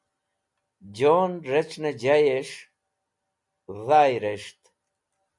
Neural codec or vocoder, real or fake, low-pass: none; real; 10.8 kHz